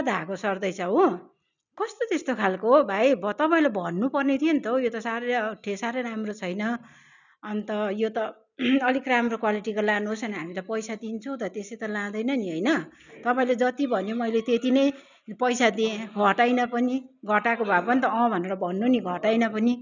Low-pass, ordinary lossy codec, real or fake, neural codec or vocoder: 7.2 kHz; none; real; none